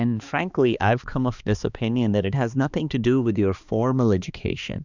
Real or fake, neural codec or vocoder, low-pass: fake; codec, 16 kHz, 2 kbps, X-Codec, HuBERT features, trained on balanced general audio; 7.2 kHz